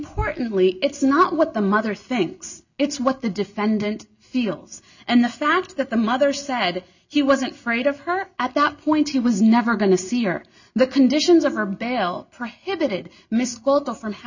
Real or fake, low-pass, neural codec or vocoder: real; 7.2 kHz; none